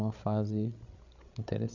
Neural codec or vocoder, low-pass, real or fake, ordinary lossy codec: codec, 16 kHz, 16 kbps, FreqCodec, larger model; 7.2 kHz; fake; none